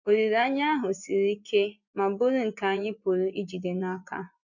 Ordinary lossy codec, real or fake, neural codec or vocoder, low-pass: none; fake; vocoder, 24 kHz, 100 mel bands, Vocos; 7.2 kHz